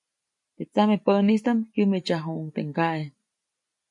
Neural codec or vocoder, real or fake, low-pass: none; real; 10.8 kHz